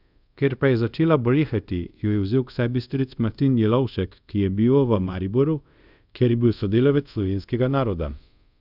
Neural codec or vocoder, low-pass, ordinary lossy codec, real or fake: codec, 24 kHz, 0.5 kbps, DualCodec; 5.4 kHz; none; fake